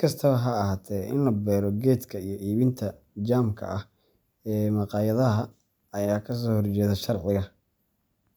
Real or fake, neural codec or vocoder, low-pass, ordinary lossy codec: real; none; none; none